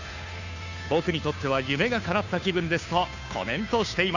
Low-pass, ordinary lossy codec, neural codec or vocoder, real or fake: 7.2 kHz; none; codec, 16 kHz, 2 kbps, FunCodec, trained on Chinese and English, 25 frames a second; fake